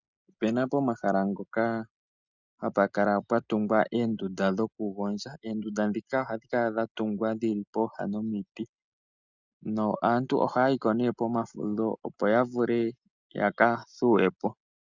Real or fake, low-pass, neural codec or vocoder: real; 7.2 kHz; none